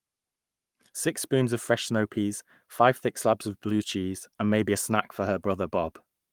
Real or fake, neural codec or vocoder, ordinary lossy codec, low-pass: fake; codec, 44.1 kHz, 7.8 kbps, Pupu-Codec; Opus, 32 kbps; 19.8 kHz